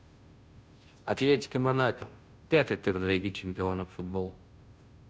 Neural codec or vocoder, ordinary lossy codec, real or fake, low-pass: codec, 16 kHz, 0.5 kbps, FunCodec, trained on Chinese and English, 25 frames a second; none; fake; none